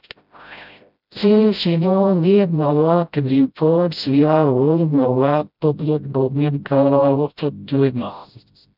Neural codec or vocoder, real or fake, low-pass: codec, 16 kHz, 0.5 kbps, FreqCodec, smaller model; fake; 5.4 kHz